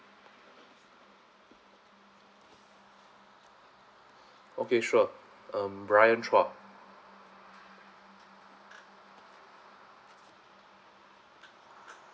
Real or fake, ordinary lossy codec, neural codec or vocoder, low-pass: real; none; none; none